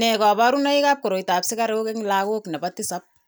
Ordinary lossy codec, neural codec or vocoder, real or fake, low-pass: none; none; real; none